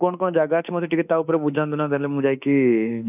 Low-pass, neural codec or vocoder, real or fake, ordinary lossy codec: 3.6 kHz; autoencoder, 48 kHz, 32 numbers a frame, DAC-VAE, trained on Japanese speech; fake; none